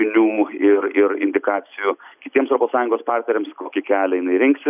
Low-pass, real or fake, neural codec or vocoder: 3.6 kHz; real; none